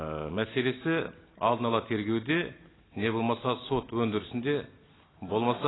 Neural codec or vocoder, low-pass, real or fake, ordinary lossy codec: none; 7.2 kHz; real; AAC, 16 kbps